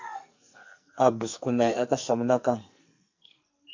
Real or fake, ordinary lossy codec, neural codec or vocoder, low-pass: fake; AAC, 48 kbps; codec, 44.1 kHz, 2.6 kbps, SNAC; 7.2 kHz